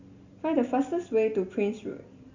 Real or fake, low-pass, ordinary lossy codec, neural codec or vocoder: real; 7.2 kHz; Opus, 64 kbps; none